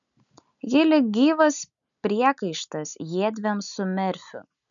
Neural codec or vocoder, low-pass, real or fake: none; 7.2 kHz; real